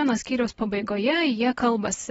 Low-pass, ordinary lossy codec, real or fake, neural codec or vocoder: 19.8 kHz; AAC, 24 kbps; real; none